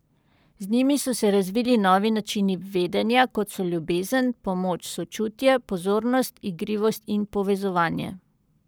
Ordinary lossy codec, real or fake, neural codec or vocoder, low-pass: none; fake; codec, 44.1 kHz, 7.8 kbps, Pupu-Codec; none